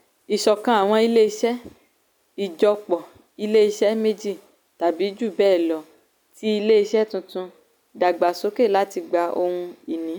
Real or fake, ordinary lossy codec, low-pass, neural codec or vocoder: real; none; none; none